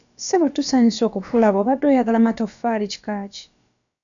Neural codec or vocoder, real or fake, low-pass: codec, 16 kHz, about 1 kbps, DyCAST, with the encoder's durations; fake; 7.2 kHz